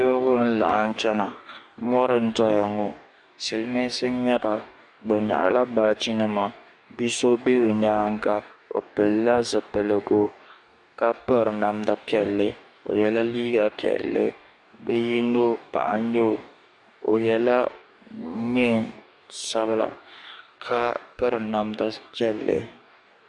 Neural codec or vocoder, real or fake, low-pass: codec, 44.1 kHz, 2.6 kbps, DAC; fake; 10.8 kHz